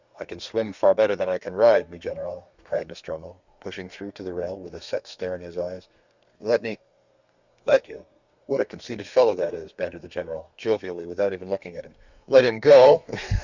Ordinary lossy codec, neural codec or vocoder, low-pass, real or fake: Opus, 64 kbps; codec, 32 kHz, 1.9 kbps, SNAC; 7.2 kHz; fake